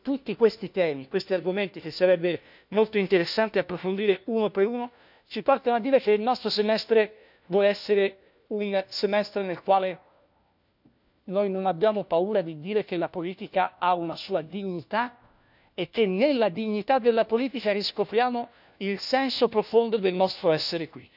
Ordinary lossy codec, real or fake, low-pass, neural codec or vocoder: none; fake; 5.4 kHz; codec, 16 kHz, 1 kbps, FunCodec, trained on LibriTTS, 50 frames a second